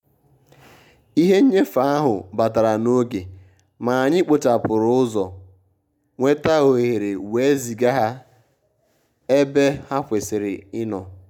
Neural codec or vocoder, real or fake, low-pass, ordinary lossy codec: none; real; 19.8 kHz; none